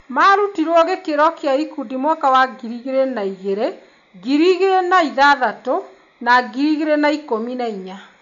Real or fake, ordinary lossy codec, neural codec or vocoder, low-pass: real; none; none; 7.2 kHz